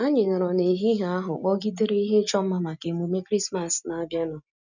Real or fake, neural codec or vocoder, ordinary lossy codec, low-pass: real; none; none; 7.2 kHz